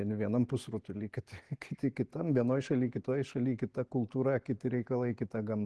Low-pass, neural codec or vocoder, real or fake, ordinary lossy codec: 10.8 kHz; none; real; Opus, 16 kbps